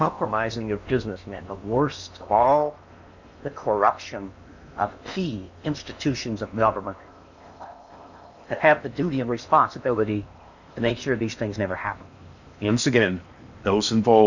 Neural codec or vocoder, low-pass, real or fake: codec, 16 kHz in and 24 kHz out, 0.8 kbps, FocalCodec, streaming, 65536 codes; 7.2 kHz; fake